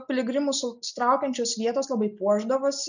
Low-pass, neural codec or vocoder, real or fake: 7.2 kHz; none; real